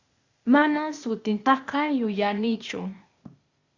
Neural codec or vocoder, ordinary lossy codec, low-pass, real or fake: codec, 16 kHz, 0.8 kbps, ZipCodec; Opus, 64 kbps; 7.2 kHz; fake